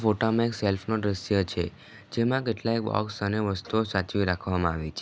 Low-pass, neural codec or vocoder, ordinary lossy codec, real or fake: none; none; none; real